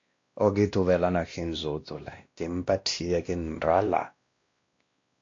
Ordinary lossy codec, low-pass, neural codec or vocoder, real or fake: AAC, 48 kbps; 7.2 kHz; codec, 16 kHz, 1 kbps, X-Codec, WavLM features, trained on Multilingual LibriSpeech; fake